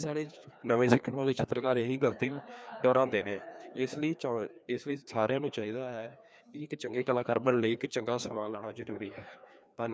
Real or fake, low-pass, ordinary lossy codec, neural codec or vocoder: fake; none; none; codec, 16 kHz, 2 kbps, FreqCodec, larger model